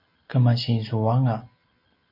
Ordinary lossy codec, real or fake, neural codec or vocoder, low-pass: MP3, 32 kbps; real; none; 5.4 kHz